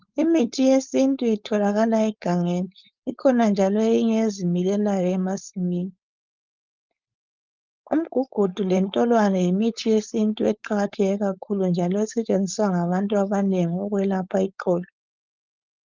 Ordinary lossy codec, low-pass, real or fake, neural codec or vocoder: Opus, 24 kbps; 7.2 kHz; fake; codec, 16 kHz, 4.8 kbps, FACodec